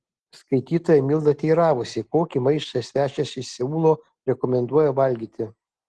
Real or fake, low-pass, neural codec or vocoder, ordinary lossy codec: real; 10.8 kHz; none; Opus, 16 kbps